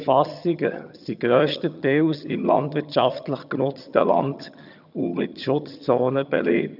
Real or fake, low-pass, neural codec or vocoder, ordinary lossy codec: fake; 5.4 kHz; vocoder, 22.05 kHz, 80 mel bands, HiFi-GAN; none